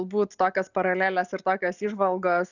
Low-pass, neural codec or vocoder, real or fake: 7.2 kHz; none; real